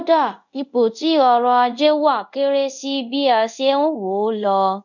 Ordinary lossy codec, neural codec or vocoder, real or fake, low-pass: none; codec, 24 kHz, 0.5 kbps, DualCodec; fake; 7.2 kHz